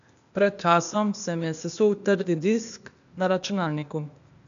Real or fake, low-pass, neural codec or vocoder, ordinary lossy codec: fake; 7.2 kHz; codec, 16 kHz, 0.8 kbps, ZipCodec; AAC, 96 kbps